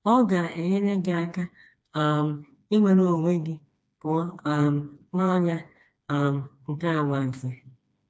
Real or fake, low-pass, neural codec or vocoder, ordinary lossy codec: fake; none; codec, 16 kHz, 2 kbps, FreqCodec, smaller model; none